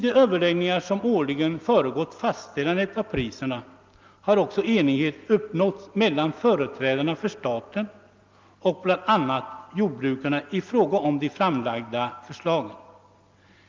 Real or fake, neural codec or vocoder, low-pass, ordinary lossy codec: real; none; 7.2 kHz; Opus, 24 kbps